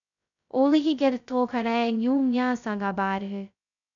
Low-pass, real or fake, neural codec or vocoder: 7.2 kHz; fake; codec, 16 kHz, 0.2 kbps, FocalCodec